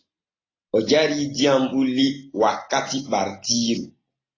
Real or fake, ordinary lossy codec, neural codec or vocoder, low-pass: real; AAC, 32 kbps; none; 7.2 kHz